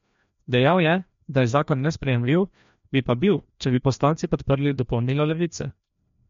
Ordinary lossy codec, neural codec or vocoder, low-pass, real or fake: MP3, 48 kbps; codec, 16 kHz, 1 kbps, FreqCodec, larger model; 7.2 kHz; fake